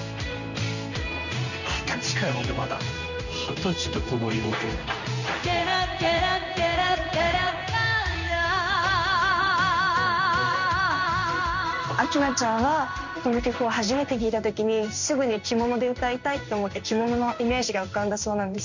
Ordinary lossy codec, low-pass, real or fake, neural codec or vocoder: none; 7.2 kHz; fake; codec, 16 kHz in and 24 kHz out, 1 kbps, XY-Tokenizer